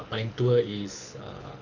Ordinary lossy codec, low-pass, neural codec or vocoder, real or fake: none; 7.2 kHz; codec, 24 kHz, 6 kbps, HILCodec; fake